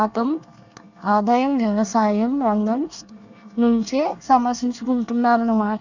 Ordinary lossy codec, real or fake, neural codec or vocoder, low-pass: none; fake; codec, 24 kHz, 1 kbps, SNAC; 7.2 kHz